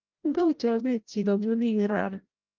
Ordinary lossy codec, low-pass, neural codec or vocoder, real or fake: Opus, 32 kbps; 7.2 kHz; codec, 16 kHz, 0.5 kbps, FreqCodec, larger model; fake